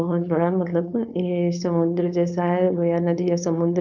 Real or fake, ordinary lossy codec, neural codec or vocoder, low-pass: fake; none; codec, 16 kHz, 4.8 kbps, FACodec; 7.2 kHz